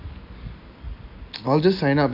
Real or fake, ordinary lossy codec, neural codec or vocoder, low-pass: real; none; none; 5.4 kHz